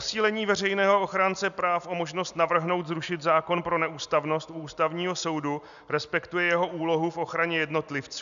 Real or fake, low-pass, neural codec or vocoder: real; 7.2 kHz; none